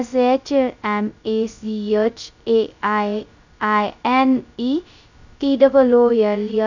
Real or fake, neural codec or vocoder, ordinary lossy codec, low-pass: fake; codec, 16 kHz, 0.2 kbps, FocalCodec; none; 7.2 kHz